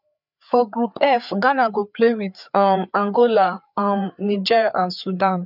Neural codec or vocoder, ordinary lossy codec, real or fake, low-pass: codec, 16 kHz, 4 kbps, FreqCodec, larger model; none; fake; 5.4 kHz